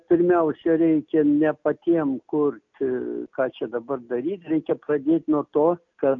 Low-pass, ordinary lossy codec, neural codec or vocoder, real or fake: 7.2 kHz; MP3, 48 kbps; none; real